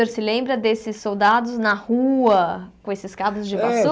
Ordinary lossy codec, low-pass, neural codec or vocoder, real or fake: none; none; none; real